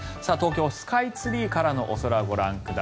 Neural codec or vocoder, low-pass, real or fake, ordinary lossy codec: none; none; real; none